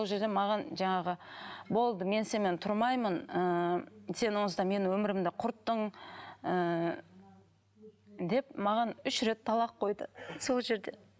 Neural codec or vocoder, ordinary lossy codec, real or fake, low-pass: none; none; real; none